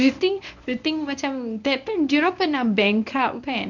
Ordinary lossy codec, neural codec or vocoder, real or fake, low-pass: none; codec, 24 kHz, 0.9 kbps, WavTokenizer, medium speech release version 1; fake; 7.2 kHz